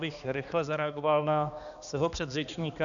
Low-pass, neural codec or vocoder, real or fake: 7.2 kHz; codec, 16 kHz, 2 kbps, X-Codec, HuBERT features, trained on balanced general audio; fake